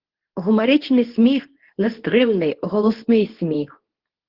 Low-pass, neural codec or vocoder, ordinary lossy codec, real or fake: 5.4 kHz; codec, 24 kHz, 0.9 kbps, WavTokenizer, medium speech release version 2; Opus, 16 kbps; fake